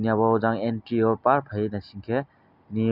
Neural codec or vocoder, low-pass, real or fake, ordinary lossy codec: none; 5.4 kHz; real; none